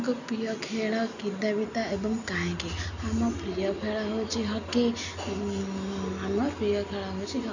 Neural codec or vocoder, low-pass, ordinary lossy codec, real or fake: none; 7.2 kHz; none; real